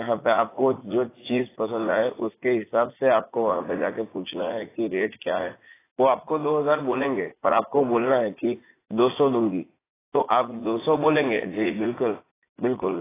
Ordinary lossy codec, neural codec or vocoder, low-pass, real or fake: AAC, 16 kbps; vocoder, 44.1 kHz, 80 mel bands, Vocos; 3.6 kHz; fake